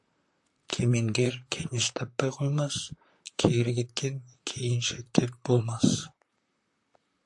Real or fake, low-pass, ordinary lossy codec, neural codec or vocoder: fake; 10.8 kHz; AAC, 64 kbps; vocoder, 44.1 kHz, 128 mel bands, Pupu-Vocoder